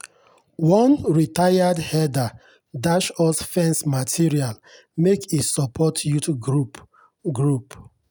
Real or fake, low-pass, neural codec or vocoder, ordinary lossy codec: real; none; none; none